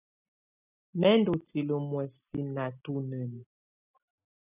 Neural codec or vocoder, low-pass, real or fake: none; 3.6 kHz; real